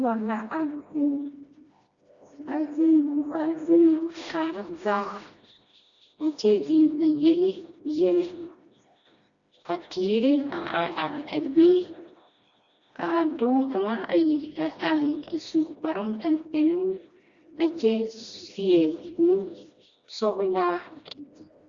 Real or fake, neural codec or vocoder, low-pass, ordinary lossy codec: fake; codec, 16 kHz, 1 kbps, FreqCodec, smaller model; 7.2 kHz; Opus, 64 kbps